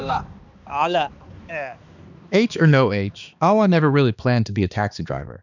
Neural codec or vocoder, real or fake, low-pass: codec, 16 kHz, 2 kbps, X-Codec, HuBERT features, trained on balanced general audio; fake; 7.2 kHz